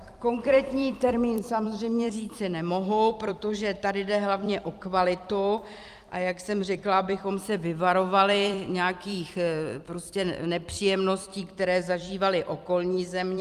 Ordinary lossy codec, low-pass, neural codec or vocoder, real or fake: Opus, 32 kbps; 14.4 kHz; vocoder, 44.1 kHz, 128 mel bands every 256 samples, BigVGAN v2; fake